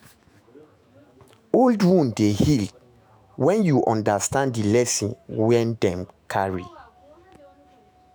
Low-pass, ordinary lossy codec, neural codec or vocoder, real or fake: none; none; autoencoder, 48 kHz, 128 numbers a frame, DAC-VAE, trained on Japanese speech; fake